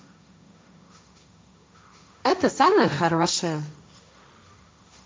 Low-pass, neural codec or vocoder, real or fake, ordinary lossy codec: none; codec, 16 kHz, 1.1 kbps, Voila-Tokenizer; fake; none